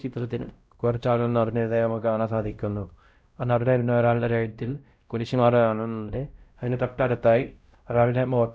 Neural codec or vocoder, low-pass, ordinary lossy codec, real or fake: codec, 16 kHz, 0.5 kbps, X-Codec, WavLM features, trained on Multilingual LibriSpeech; none; none; fake